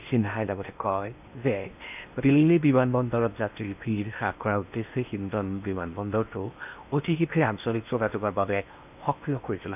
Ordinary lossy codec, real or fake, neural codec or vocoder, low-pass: none; fake; codec, 16 kHz in and 24 kHz out, 0.8 kbps, FocalCodec, streaming, 65536 codes; 3.6 kHz